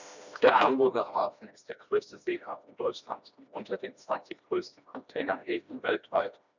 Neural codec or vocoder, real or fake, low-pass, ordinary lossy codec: codec, 16 kHz, 1 kbps, FreqCodec, smaller model; fake; 7.2 kHz; none